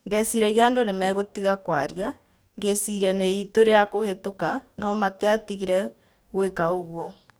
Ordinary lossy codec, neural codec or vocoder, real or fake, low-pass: none; codec, 44.1 kHz, 2.6 kbps, DAC; fake; none